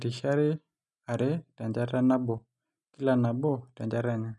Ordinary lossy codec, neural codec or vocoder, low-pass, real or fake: none; none; 10.8 kHz; real